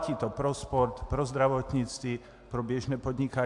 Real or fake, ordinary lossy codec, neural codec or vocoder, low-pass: real; AAC, 64 kbps; none; 10.8 kHz